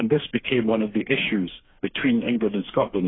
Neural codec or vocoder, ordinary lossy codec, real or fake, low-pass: codec, 16 kHz, 4 kbps, FreqCodec, smaller model; AAC, 16 kbps; fake; 7.2 kHz